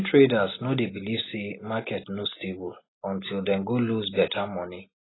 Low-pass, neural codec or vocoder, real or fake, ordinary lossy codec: 7.2 kHz; none; real; AAC, 16 kbps